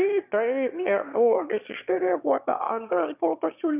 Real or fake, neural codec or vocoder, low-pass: fake; autoencoder, 22.05 kHz, a latent of 192 numbers a frame, VITS, trained on one speaker; 3.6 kHz